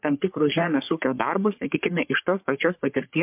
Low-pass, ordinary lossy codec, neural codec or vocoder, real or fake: 3.6 kHz; MP3, 32 kbps; codec, 44.1 kHz, 3.4 kbps, Pupu-Codec; fake